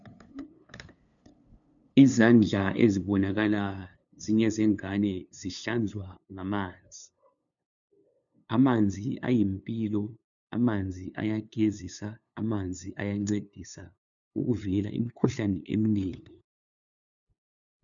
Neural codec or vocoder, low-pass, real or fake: codec, 16 kHz, 2 kbps, FunCodec, trained on LibriTTS, 25 frames a second; 7.2 kHz; fake